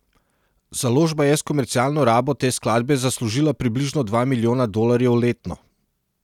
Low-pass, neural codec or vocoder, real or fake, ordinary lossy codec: 19.8 kHz; none; real; none